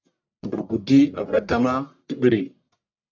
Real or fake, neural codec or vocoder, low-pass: fake; codec, 44.1 kHz, 1.7 kbps, Pupu-Codec; 7.2 kHz